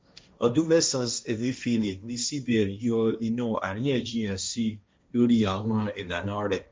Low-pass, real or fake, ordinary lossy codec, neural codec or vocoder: none; fake; none; codec, 16 kHz, 1.1 kbps, Voila-Tokenizer